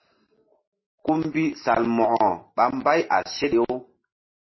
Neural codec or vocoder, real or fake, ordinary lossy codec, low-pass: none; real; MP3, 24 kbps; 7.2 kHz